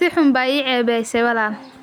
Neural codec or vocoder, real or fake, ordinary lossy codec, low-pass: none; real; none; none